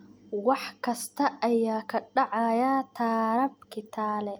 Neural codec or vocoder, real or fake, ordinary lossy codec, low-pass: none; real; none; none